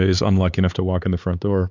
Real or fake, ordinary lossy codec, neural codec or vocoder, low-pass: fake; Opus, 64 kbps; codec, 16 kHz, 4 kbps, X-Codec, HuBERT features, trained on LibriSpeech; 7.2 kHz